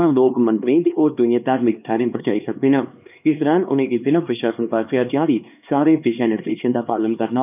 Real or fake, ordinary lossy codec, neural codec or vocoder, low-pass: fake; none; codec, 16 kHz, 2 kbps, X-Codec, HuBERT features, trained on LibriSpeech; 3.6 kHz